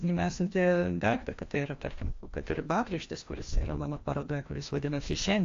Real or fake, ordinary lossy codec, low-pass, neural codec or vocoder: fake; AAC, 48 kbps; 7.2 kHz; codec, 16 kHz, 1 kbps, FreqCodec, larger model